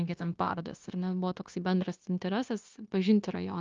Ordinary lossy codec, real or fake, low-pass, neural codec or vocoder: Opus, 32 kbps; fake; 7.2 kHz; codec, 16 kHz, 0.9 kbps, LongCat-Audio-Codec